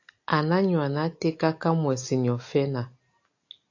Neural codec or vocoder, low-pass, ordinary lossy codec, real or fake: none; 7.2 kHz; AAC, 48 kbps; real